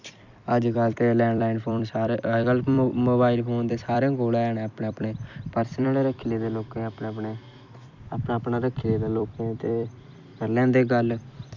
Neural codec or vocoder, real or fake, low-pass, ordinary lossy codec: vocoder, 44.1 kHz, 128 mel bands every 256 samples, BigVGAN v2; fake; 7.2 kHz; none